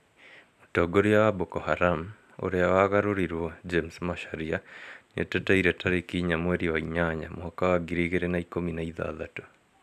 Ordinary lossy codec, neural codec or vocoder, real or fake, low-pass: none; none; real; 14.4 kHz